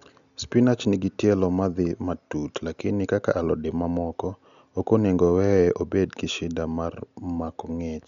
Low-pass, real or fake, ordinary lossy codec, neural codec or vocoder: 7.2 kHz; real; none; none